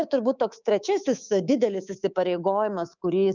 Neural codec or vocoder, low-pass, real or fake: codec, 24 kHz, 3.1 kbps, DualCodec; 7.2 kHz; fake